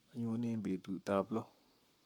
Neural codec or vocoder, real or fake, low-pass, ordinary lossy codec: codec, 44.1 kHz, 7.8 kbps, Pupu-Codec; fake; 19.8 kHz; none